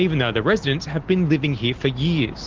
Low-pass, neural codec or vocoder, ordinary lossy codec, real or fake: 7.2 kHz; none; Opus, 16 kbps; real